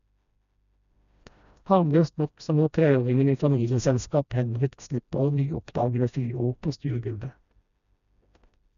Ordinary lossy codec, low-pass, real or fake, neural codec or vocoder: none; 7.2 kHz; fake; codec, 16 kHz, 1 kbps, FreqCodec, smaller model